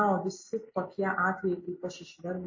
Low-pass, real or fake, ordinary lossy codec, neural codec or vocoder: 7.2 kHz; real; MP3, 32 kbps; none